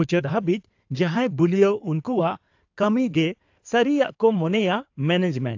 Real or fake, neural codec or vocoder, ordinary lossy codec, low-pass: fake; codec, 16 kHz in and 24 kHz out, 2.2 kbps, FireRedTTS-2 codec; AAC, 48 kbps; 7.2 kHz